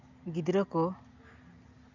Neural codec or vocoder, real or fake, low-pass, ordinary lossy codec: none; real; 7.2 kHz; none